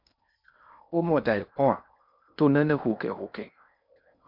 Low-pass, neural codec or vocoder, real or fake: 5.4 kHz; codec, 16 kHz in and 24 kHz out, 0.8 kbps, FocalCodec, streaming, 65536 codes; fake